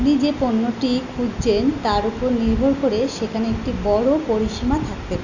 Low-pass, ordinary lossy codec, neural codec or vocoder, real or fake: 7.2 kHz; none; none; real